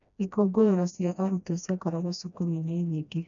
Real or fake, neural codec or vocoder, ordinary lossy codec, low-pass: fake; codec, 16 kHz, 1 kbps, FreqCodec, smaller model; none; 7.2 kHz